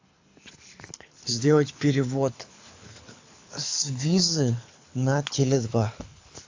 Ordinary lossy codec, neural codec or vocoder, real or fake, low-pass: AAC, 48 kbps; codec, 24 kHz, 6 kbps, HILCodec; fake; 7.2 kHz